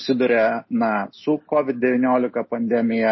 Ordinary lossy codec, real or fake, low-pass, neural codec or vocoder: MP3, 24 kbps; real; 7.2 kHz; none